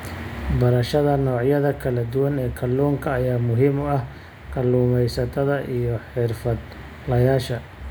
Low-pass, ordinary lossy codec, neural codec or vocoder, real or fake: none; none; none; real